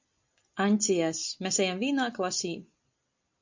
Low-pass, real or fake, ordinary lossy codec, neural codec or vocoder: 7.2 kHz; real; MP3, 64 kbps; none